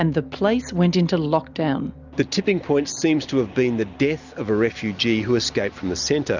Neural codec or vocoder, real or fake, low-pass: none; real; 7.2 kHz